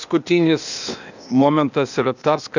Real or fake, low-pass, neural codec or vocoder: fake; 7.2 kHz; codec, 16 kHz, 0.8 kbps, ZipCodec